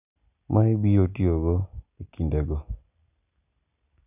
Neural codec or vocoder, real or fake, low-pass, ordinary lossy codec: none; real; 3.6 kHz; none